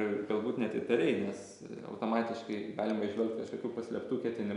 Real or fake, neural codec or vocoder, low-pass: real; none; 10.8 kHz